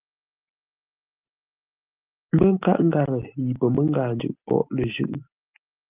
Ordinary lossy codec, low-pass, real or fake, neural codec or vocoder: Opus, 32 kbps; 3.6 kHz; real; none